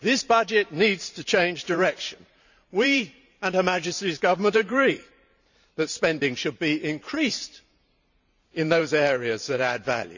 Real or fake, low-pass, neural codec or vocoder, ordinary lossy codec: fake; 7.2 kHz; vocoder, 44.1 kHz, 128 mel bands every 512 samples, BigVGAN v2; none